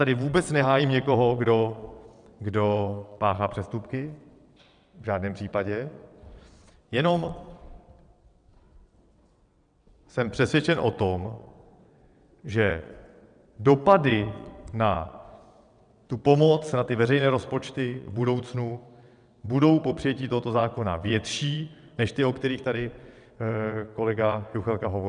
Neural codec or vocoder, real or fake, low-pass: vocoder, 22.05 kHz, 80 mel bands, WaveNeXt; fake; 9.9 kHz